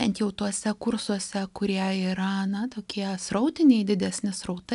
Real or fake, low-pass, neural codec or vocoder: real; 10.8 kHz; none